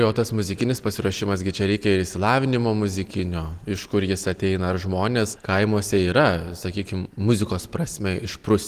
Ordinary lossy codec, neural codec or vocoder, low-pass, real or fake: Opus, 24 kbps; none; 14.4 kHz; real